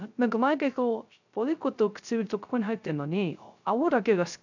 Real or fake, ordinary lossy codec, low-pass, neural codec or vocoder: fake; none; 7.2 kHz; codec, 16 kHz, 0.3 kbps, FocalCodec